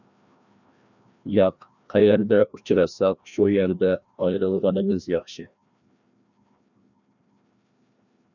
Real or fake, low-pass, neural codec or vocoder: fake; 7.2 kHz; codec, 16 kHz, 1 kbps, FreqCodec, larger model